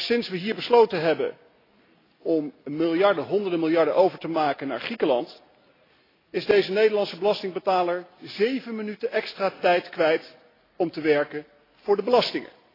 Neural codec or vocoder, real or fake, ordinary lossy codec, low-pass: none; real; AAC, 24 kbps; 5.4 kHz